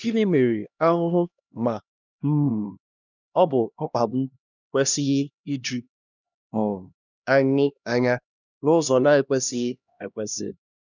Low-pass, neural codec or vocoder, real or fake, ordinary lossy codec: 7.2 kHz; codec, 16 kHz, 1 kbps, X-Codec, HuBERT features, trained on LibriSpeech; fake; none